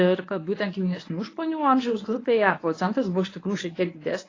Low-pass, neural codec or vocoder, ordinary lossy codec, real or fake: 7.2 kHz; codec, 24 kHz, 0.9 kbps, WavTokenizer, medium speech release version 1; AAC, 32 kbps; fake